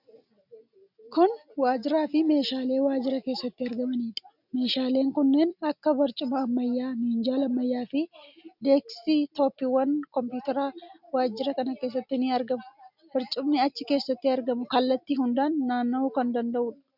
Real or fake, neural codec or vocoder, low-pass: real; none; 5.4 kHz